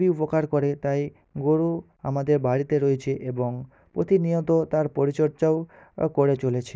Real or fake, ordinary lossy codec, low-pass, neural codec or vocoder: real; none; none; none